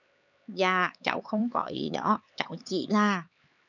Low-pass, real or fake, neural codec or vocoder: 7.2 kHz; fake; codec, 16 kHz, 4 kbps, X-Codec, HuBERT features, trained on LibriSpeech